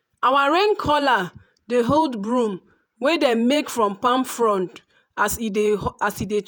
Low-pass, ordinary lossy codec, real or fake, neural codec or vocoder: none; none; fake; vocoder, 48 kHz, 128 mel bands, Vocos